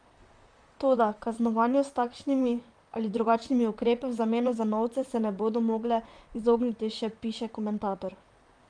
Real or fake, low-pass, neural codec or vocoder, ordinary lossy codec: fake; 9.9 kHz; vocoder, 44.1 kHz, 128 mel bands, Pupu-Vocoder; Opus, 32 kbps